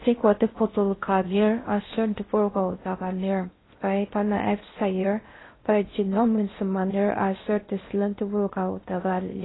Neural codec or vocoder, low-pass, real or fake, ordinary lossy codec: codec, 16 kHz in and 24 kHz out, 0.6 kbps, FocalCodec, streaming, 4096 codes; 7.2 kHz; fake; AAC, 16 kbps